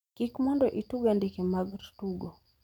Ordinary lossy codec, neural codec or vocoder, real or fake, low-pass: none; none; real; 19.8 kHz